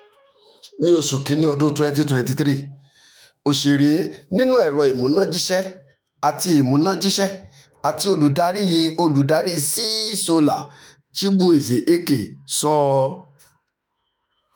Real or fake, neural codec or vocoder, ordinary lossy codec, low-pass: fake; autoencoder, 48 kHz, 32 numbers a frame, DAC-VAE, trained on Japanese speech; none; none